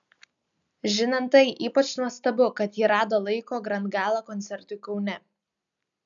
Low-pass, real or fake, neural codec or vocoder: 7.2 kHz; real; none